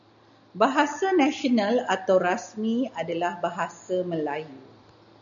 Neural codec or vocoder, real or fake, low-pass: none; real; 7.2 kHz